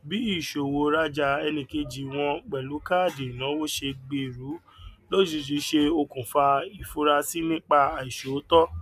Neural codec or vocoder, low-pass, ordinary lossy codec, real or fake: none; 14.4 kHz; none; real